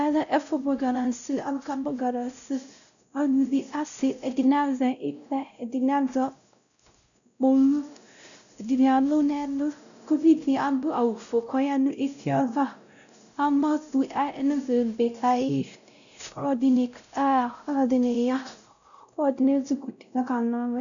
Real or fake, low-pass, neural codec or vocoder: fake; 7.2 kHz; codec, 16 kHz, 0.5 kbps, X-Codec, WavLM features, trained on Multilingual LibriSpeech